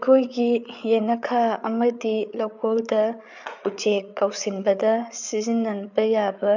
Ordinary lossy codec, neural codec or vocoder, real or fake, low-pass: none; codec, 16 kHz, 8 kbps, FreqCodec, larger model; fake; 7.2 kHz